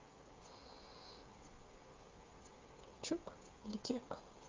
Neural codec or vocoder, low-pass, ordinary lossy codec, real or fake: codec, 16 kHz, 16 kbps, FreqCodec, smaller model; 7.2 kHz; Opus, 24 kbps; fake